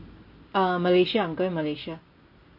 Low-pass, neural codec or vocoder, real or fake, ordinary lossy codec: 5.4 kHz; none; real; MP3, 32 kbps